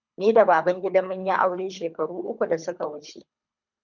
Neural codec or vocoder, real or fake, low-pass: codec, 24 kHz, 3 kbps, HILCodec; fake; 7.2 kHz